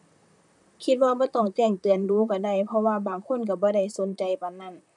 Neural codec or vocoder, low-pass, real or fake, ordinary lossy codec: vocoder, 44.1 kHz, 128 mel bands, Pupu-Vocoder; 10.8 kHz; fake; none